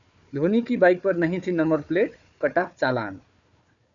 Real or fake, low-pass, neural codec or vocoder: fake; 7.2 kHz; codec, 16 kHz, 4 kbps, FunCodec, trained on Chinese and English, 50 frames a second